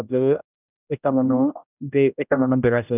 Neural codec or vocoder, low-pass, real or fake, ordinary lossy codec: codec, 16 kHz, 0.5 kbps, X-Codec, HuBERT features, trained on general audio; 3.6 kHz; fake; none